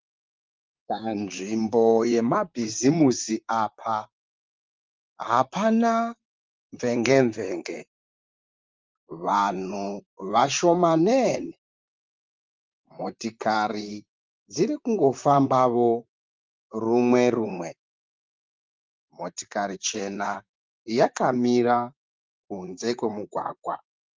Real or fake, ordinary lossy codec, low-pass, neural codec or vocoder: fake; Opus, 32 kbps; 7.2 kHz; vocoder, 44.1 kHz, 128 mel bands, Pupu-Vocoder